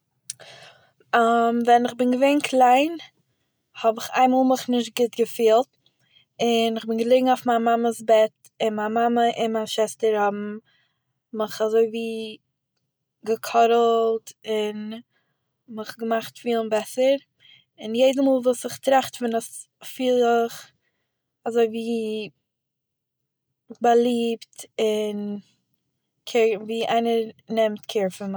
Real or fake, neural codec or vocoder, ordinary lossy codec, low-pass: real; none; none; none